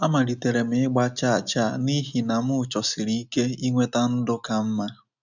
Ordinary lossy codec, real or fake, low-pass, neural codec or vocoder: none; real; 7.2 kHz; none